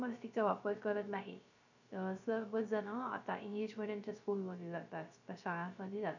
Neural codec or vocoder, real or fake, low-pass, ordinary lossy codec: codec, 16 kHz, 0.3 kbps, FocalCodec; fake; 7.2 kHz; none